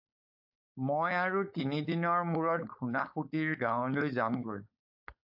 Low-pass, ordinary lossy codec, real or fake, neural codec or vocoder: 5.4 kHz; AAC, 48 kbps; fake; codec, 16 kHz, 4.8 kbps, FACodec